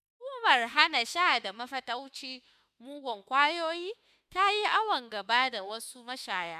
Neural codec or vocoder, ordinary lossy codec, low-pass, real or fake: autoencoder, 48 kHz, 32 numbers a frame, DAC-VAE, trained on Japanese speech; none; 14.4 kHz; fake